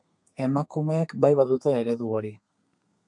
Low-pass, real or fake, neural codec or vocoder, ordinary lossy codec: 10.8 kHz; fake; codec, 32 kHz, 1.9 kbps, SNAC; MP3, 96 kbps